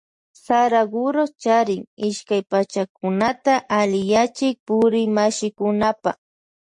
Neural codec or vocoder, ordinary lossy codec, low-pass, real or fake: none; MP3, 48 kbps; 10.8 kHz; real